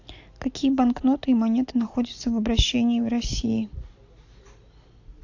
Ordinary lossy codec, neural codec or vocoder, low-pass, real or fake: AAC, 48 kbps; none; 7.2 kHz; real